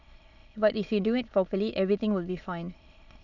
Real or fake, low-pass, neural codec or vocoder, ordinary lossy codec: fake; 7.2 kHz; autoencoder, 22.05 kHz, a latent of 192 numbers a frame, VITS, trained on many speakers; none